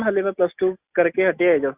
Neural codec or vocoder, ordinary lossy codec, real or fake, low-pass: none; Opus, 64 kbps; real; 3.6 kHz